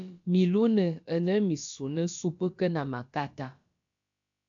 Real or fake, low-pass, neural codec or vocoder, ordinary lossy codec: fake; 7.2 kHz; codec, 16 kHz, about 1 kbps, DyCAST, with the encoder's durations; AAC, 64 kbps